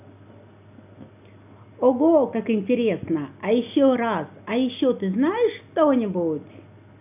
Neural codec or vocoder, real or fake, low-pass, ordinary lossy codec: none; real; 3.6 kHz; none